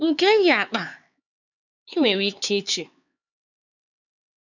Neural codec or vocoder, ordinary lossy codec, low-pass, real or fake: codec, 16 kHz, 4 kbps, X-Codec, HuBERT features, trained on LibriSpeech; none; 7.2 kHz; fake